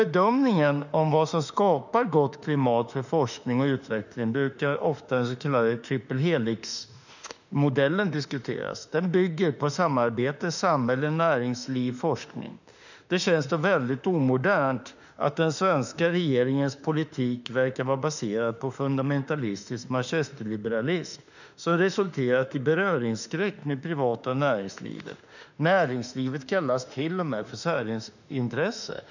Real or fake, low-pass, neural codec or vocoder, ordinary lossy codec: fake; 7.2 kHz; autoencoder, 48 kHz, 32 numbers a frame, DAC-VAE, trained on Japanese speech; none